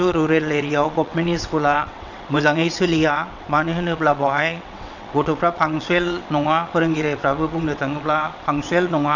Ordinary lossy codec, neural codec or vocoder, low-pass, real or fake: none; vocoder, 22.05 kHz, 80 mel bands, WaveNeXt; 7.2 kHz; fake